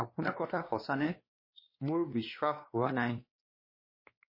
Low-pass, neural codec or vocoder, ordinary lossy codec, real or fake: 5.4 kHz; codec, 16 kHz, 2 kbps, X-Codec, WavLM features, trained on Multilingual LibriSpeech; MP3, 24 kbps; fake